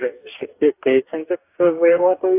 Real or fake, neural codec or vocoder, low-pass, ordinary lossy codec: fake; codec, 44.1 kHz, 2.6 kbps, DAC; 3.6 kHz; AAC, 24 kbps